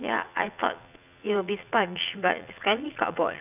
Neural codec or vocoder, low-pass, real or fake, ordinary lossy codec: vocoder, 44.1 kHz, 80 mel bands, Vocos; 3.6 kHz; fake; none